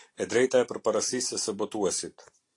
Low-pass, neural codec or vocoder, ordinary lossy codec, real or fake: 10.8 kHz; none; AAC, 48 kbps; real